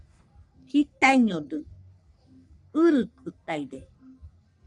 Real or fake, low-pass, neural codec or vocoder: fake; 10.8 kHz; codec, 44.1 kHz, 3.4 kbps, Pupu-Codec